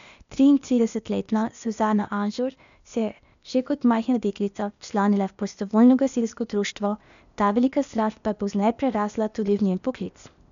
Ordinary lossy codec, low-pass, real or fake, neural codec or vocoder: MP3, 96 kbps; 7.2 kHz; fake; codec, 16 kHz, 0.8 kbps, ZipCodec